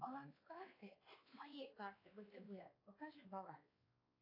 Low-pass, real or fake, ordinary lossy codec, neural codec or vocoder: 5.4 kHz; fake; AAC, 24 kbps; codec, 16 kHz, 2 kbps, X-Codec, WavLM features, trained on Multilingual LibriSpeech